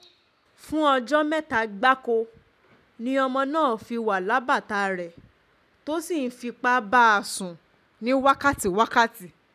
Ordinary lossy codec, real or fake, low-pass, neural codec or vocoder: none; real; 14.4 kHz; none